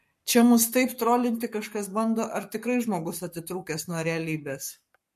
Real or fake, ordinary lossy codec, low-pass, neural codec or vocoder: fake; MP3, 64 kbps; 14.4 kHz; codec, 44.1 kHz, 7.8 kbps, Pupu-Codec